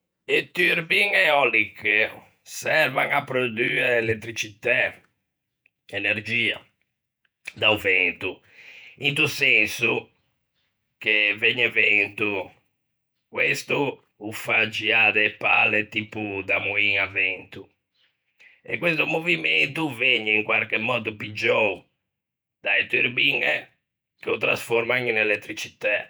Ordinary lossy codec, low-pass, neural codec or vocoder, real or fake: none; none; vocoder, 48 kHz, 128 mel bands, Vocos; fake